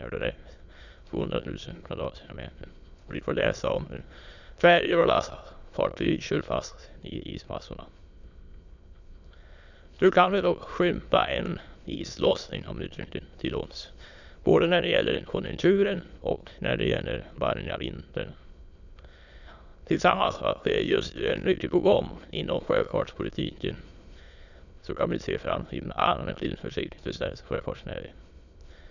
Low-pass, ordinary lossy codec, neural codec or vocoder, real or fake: 7.2 kHz; none; autoencoder, 22.05 kHz, a latent of 192 numbers a frame, VITS, trained on many speakers; fake